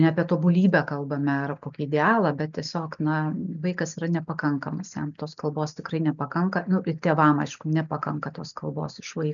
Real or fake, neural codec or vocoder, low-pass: real; none; 7.2 kHz